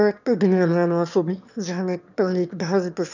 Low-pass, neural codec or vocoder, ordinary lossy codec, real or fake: 7.2 kHz; autoencoder, 22.05 kHz, a latent of 192 numbers a frame, VITS, trained on one speaker; none; fake